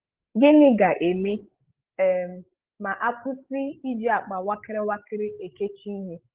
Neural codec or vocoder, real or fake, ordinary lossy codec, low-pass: codec, 16 kHz, 16 kbps, FreqCodec, larger model; fake; Opus, 16 kbps; 3.6 kHz